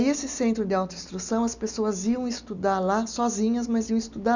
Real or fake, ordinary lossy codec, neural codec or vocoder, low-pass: real; none; none; 7.2 kHz